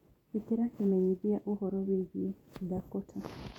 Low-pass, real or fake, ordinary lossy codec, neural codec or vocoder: 19.8 kHz; fake; none; vocoder, 48 kHz, 128 mel bands, Vocos